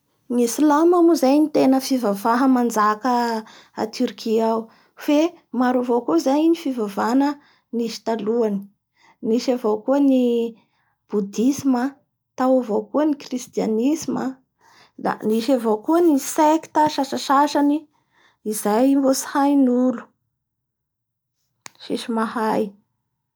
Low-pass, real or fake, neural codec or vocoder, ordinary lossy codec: none; real; none; none